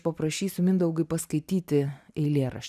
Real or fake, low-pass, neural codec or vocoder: real; 14.4 kHz; none